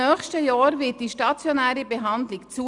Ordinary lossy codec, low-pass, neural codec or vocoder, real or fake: none; 10.8 kHz; none; real